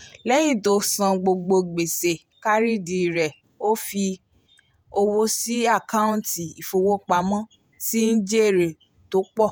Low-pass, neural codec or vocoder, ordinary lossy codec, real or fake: none; vocoder, 48 kHz, 128 mel bands, Vocos; none; fake